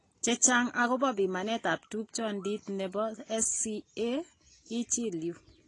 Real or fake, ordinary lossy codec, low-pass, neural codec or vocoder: real; AAC, 32 kbps; 10.8 kHz; none